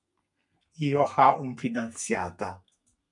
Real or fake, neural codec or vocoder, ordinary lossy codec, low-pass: fake; codec, 32 kHz, 1.9 kbps, SNAC; MP3, 64 kbps; 10.8 kHz